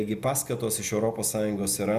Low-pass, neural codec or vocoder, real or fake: 14.4 kHz; none; real